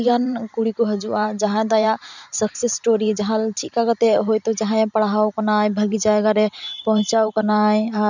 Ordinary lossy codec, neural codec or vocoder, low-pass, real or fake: none; vocoder, 44.1 kHz, 128 mel bands every 512 samples, BigVGAN v2; 7.2 kHz; fake